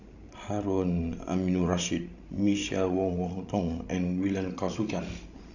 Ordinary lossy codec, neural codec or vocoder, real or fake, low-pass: none; codec, 16 kHz, 16 kbps, FreqCodec, larger model; fake; 7.2 kHz